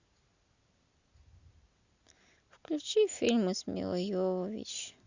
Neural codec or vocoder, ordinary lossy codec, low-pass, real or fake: none; Opus, 64 kbps; 7.2 kHz; real